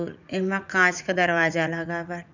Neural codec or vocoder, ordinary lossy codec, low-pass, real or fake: vocoder, 44.1 kHz, 80 mel bands, Vocos; none; 7.2 kHz; fake